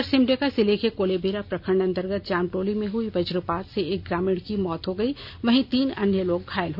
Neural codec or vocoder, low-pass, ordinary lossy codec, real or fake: none; 5.4 kHz; none; real